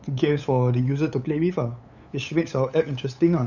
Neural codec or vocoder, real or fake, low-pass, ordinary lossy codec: codec, 16 kHz, 8 kbps, FunCodec, trained on LibriTTS, 25 frames a second; fake; 7.2 kHz; none